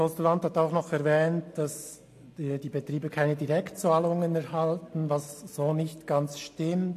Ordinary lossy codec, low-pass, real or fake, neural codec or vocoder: AAC, 64 kbps; 14.4 kHz; real; none